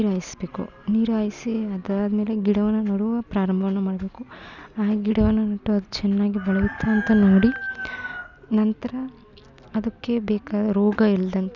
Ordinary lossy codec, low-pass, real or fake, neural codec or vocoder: none; 7.2 kHz; real; none